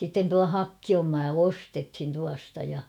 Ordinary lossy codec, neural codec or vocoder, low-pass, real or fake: none; autoencoder, 48 kHz, 128 numbers a frame, DAC-VAE, trained on Japanese speech; 19.8 kHz; fake